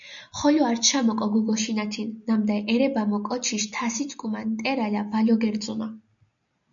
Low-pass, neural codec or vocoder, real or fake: 7.2 kHz; none; real